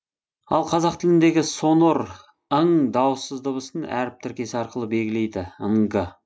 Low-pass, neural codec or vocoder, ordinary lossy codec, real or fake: none; none; none; real